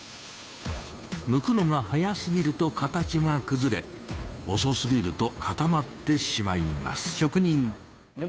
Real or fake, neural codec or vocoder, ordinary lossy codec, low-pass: fake; codec, 16 kHz, 2 kbps, FunCodec, trained on Chinese and English, 25 frames a second; none; none